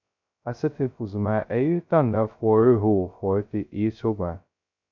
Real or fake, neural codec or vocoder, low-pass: fake; codec, 16 kHz, 0.2 kbps, FocalCodec; 7.2 kHz